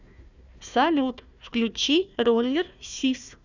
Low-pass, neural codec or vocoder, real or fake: 7.2 kHz; codec, 16 kHz, 1 kbps, FunCodec, trained on Chinese and English, 50 frames a second; fake